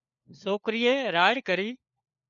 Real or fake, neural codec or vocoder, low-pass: fake; codec, 16 kHz, 4 kbps, FunCodec, trained on LibriTTS, 50 frames a second; 7.2 kHz